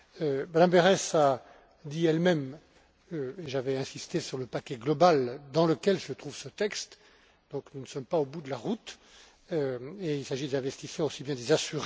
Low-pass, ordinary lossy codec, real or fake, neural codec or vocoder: none; none; real; none